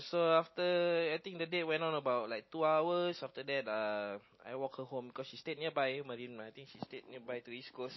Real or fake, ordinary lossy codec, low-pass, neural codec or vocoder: real; MP3, 24 kbps; 7.2 kHz; none